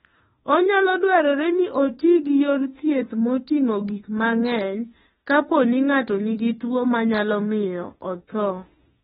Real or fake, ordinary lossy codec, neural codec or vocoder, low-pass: fake; AAC, 16 kbps; autoencoder, 48 kHz, 32 numbers a frame, DAC-VAE, trained on Japanese speech; 19.8 kHz